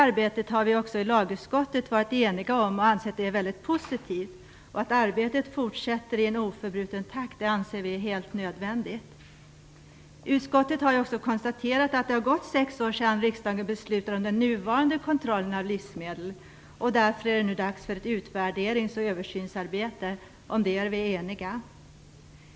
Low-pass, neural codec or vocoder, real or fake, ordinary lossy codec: none; none; real; none